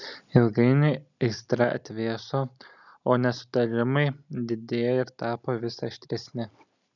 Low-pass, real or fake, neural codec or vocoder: 7.2 kHz; real; none